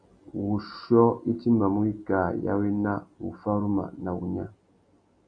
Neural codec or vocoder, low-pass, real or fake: none; 9.9 kHz; real